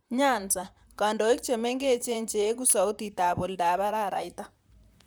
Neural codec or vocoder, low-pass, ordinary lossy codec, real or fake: vocoder, 44.1 kHz, 128 mel bands, Pupu-Vocoder; none; none; fake